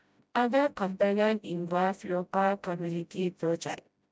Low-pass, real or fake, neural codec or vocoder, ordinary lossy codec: none; fake; codec, 16 kHz, 0.5 kbps, FreqCodec, smaller model; none